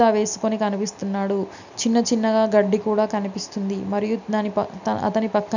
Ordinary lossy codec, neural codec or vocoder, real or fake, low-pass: none; none; real; 7.2 kHz